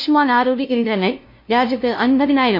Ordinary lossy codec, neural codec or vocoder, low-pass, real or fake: MP3, 32 kbps; codec, 16 kHz, 0.5 kbps, FunCodec, trained on LibriTTS, 25 frames a second; 5.4 kHz; fake